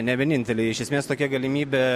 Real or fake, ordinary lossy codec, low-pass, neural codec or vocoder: real; MP3, 64 kbps; 14.4 kHz; none